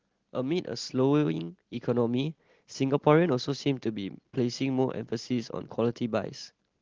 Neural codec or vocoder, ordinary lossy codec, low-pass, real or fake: none; Opus, 16 kbps; 7.2 kHz; real